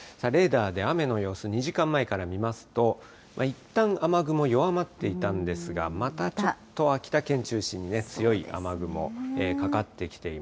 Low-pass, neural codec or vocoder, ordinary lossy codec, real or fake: none; none; none; real